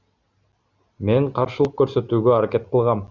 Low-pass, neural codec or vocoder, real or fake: 7.2 kHz; none; real